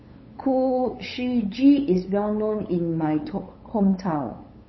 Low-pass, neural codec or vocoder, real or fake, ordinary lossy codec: 7.2 kHz; codec, 16 kHz, 8 kbps, FunCodec, trained on LibriTTS, 25 frames a second; fake; MP3, 24 kbps